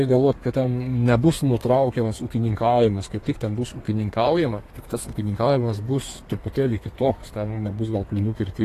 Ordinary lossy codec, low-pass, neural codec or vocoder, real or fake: AAC, 48 kbps; 14.4 kHz; codec, 32 kHz, 1.9 kbps, SNAC; fake